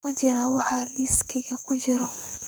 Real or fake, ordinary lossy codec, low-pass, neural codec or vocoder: fake; none; none; codec, 44.1 kHz, 2.6 kbps, SNAC